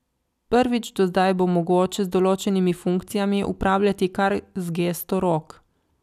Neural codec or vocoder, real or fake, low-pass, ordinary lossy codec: none; real; 14.4 kHz; none